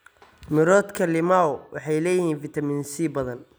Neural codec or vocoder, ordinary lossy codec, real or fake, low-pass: none; none; real; none